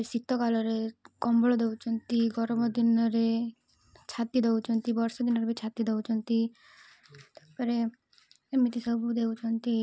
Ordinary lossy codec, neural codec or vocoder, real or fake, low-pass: none; none; real; none